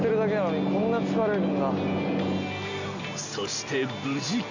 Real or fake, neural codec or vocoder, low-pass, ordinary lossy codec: real; none; 7.2 kHz; none